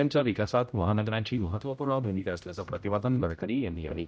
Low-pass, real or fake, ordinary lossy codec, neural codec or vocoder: none; fake; none; codec, 16 kHz, 0.5 kbps, X-Codec, HuBERT features, trained on general audio